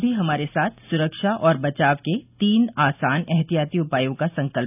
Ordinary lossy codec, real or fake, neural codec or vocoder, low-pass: none; real; none; 3.6 kHz